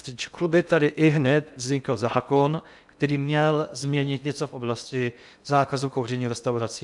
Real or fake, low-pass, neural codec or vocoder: fake; 10.8 kHz; codec, 16 kHz in and 24 kHz out, 0.6 kbps, FocalCodec, streaming, 2048 codes